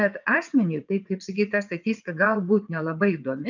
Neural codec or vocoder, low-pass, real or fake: vocoder, 22.05 kHz, 80 mel bands, WaveNeXt; 7.2 kHz; fake